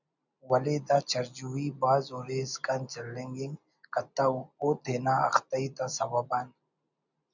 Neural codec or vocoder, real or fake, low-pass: none; real; 7.2 kHz